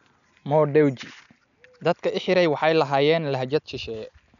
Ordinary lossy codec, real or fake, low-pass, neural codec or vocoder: none; real; 7.2 kHz; none